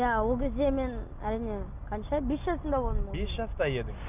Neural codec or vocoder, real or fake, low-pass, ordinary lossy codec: none; real; 3.6 kHz; none